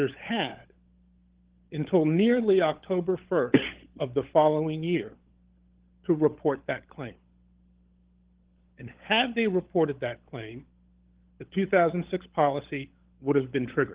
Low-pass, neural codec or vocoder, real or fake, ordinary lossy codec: 3.6 kHz; codec, 16 kHz, 16 kbps, FunCodec, trained on LibriTTS, 50 frames a second; fake; Opus, 16 kbps